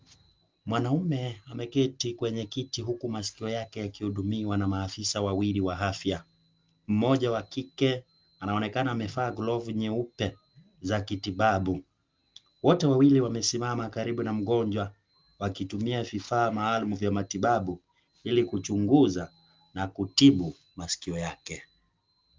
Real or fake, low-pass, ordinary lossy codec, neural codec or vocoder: real; 7.2 kHz; Opus, 24 kbps; none